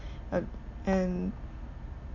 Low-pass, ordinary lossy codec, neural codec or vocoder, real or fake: 7.2 kHz; none; none; real